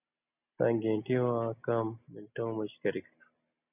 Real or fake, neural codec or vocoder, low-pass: real; none; 3.6 kHz